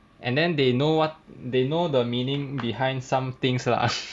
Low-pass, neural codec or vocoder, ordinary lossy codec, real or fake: none; none; none; real